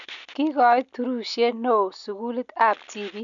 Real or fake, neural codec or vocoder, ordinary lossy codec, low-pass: real; none; none; 7.2 kHz